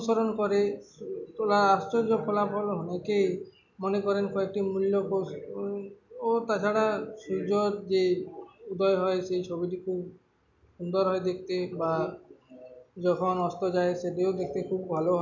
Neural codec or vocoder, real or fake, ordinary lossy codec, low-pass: none; real; none; 7.2 kHz